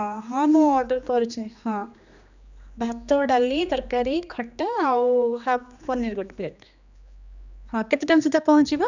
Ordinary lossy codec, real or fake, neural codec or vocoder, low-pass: none; fake; codec, 16 kHz, 2 kbps, X-Codec, HuBERT features, trained on general audio; 7.2 kHz